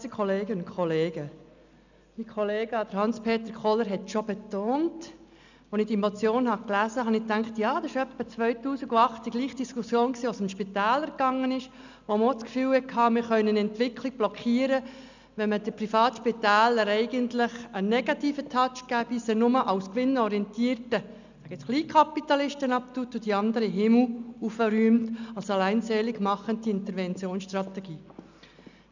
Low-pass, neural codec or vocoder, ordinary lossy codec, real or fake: 7.2 kHz; none; none; real